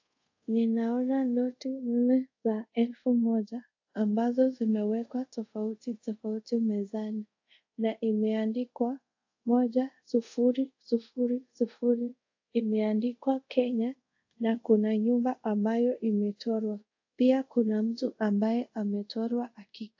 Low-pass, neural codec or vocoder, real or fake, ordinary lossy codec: 7.2 kHz; codec, 24 kHz, 0.5 kbps, DualCodec; fake; AAC, 48 kbps